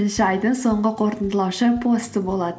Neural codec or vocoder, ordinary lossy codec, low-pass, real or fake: none; none; none; real